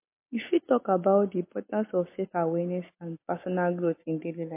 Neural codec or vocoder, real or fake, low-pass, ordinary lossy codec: none; real; 3.6 kHz; MP3, 24 kbps